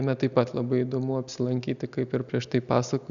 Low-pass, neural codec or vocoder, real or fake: 7.2 kHz; none; real